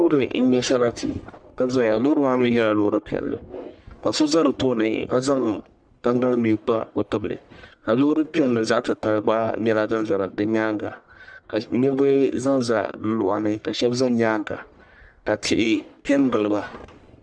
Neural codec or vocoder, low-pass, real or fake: codec, 44.1 kHz, 1.7 kbps, Pupu-Codec; 9.9 kHz; fake